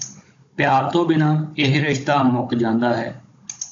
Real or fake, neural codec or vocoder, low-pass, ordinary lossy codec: fake; codec, 16 kHz, 16 kbps, FunCodec, trained on Chinese and English, 50 frames a second; 7.2 kHz; AAC, 48 kbps